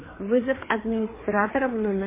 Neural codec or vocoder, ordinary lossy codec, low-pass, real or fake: codec, 16 kHz, 4 kbps, FreqCodec, larger model; MP3, 16 kbps; 3.6 kHz; fake